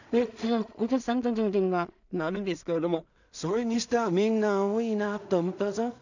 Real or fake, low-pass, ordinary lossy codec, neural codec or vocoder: fake; 7.2 kHz; none; codec, 16 kHz in and 24 kHz out, 0.4 kbps, LongCat-Audio-Codec, two codebook decoder